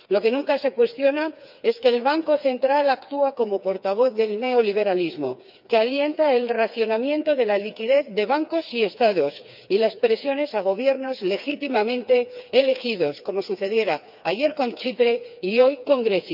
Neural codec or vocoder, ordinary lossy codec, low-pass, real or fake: codec, 16 kHz, 4 kbps, FreqCodec, smaller model; none; 5.4 kHz; fake